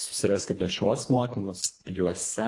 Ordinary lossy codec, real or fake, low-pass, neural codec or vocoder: AAC, 48 kbps; fake; 10.8 kHz; codec, 24 kHz, 1.5 kbps, HILCodec